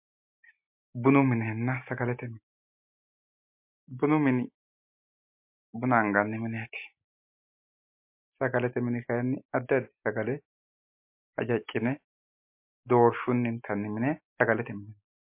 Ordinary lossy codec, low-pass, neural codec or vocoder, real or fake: MP3, 32 kbps; 3.6 kHz; none; real